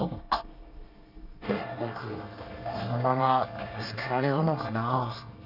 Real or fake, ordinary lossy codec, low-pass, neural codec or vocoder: fake; none; 5.4 kHz; codec, 24 kHz, 1 kbps, SNAC